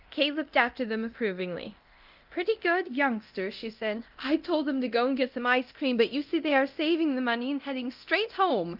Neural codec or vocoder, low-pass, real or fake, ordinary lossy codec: codec, 24 kHz, 0.9 kbps, DualCodec; 5.4 kHz; fake; Opus, 24 kbps